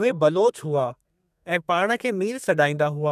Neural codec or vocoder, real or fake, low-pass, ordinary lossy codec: codec, 32 kHz, 1.9 kbps, SNAC; fake; 14.4 kHz; none